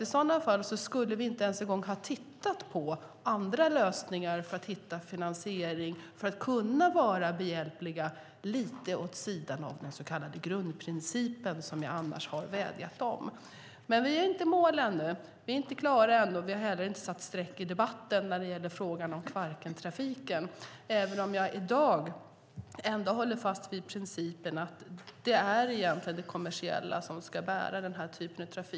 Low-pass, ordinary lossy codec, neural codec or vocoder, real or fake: none; none; none; real